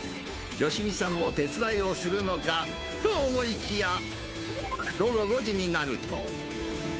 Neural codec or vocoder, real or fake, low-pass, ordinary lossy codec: codec, 16 kHz, 2 kbps, FunCodec, trained on Chinese and English, 25 frames a second; fake; none; none